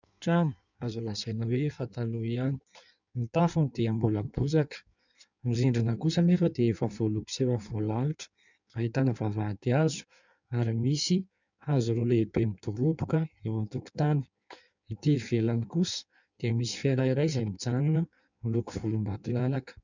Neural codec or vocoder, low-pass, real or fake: codec, 16 kHz in and 24 kHz out, 1.1 kbps, FireRedTTS-2 codec; 7.2 kHz; fake